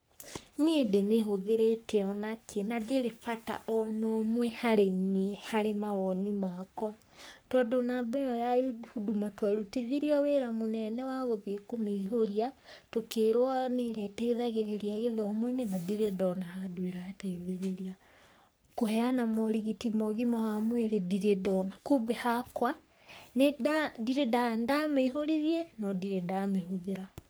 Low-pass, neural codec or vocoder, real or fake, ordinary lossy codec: none; codec, 44.1 kHz, 3.4 kbps, Pupu-Codec; fake; none